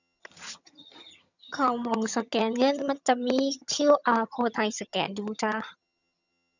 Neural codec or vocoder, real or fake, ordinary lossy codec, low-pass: vocoder, 22.05 kHz, 80 mel bands, HiFi-GAN; fake; none; 7.2 kHz